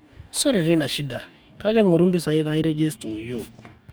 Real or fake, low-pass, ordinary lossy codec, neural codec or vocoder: fake; none; none; codec, 44.1 kHz, 2.6 kbps, DAC